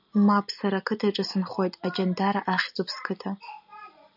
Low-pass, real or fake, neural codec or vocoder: 5.4 kHz; real; none